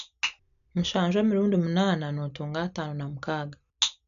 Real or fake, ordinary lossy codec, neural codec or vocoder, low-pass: real; MP3, 96 kbps; none; 7.2 kHz